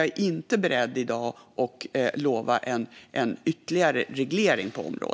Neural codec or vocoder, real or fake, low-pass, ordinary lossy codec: none; real; none; none